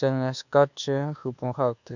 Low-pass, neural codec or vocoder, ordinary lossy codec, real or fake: 7.2 kHz; codec, 24 kHz, 1.2 kbps, DualCodec; none; fake